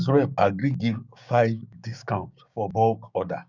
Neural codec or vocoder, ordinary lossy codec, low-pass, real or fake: codec, 16 kHz, 6 kbps, DAC; none; 7.2 kHz; fake